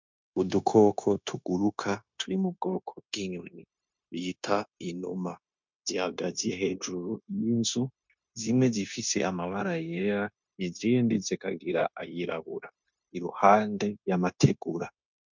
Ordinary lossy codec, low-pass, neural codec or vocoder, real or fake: MP3, 64 kbps; 7.2 kHz; codec, 16 kHz, 0.9 kbps, LongCat-Audio-Codec; fake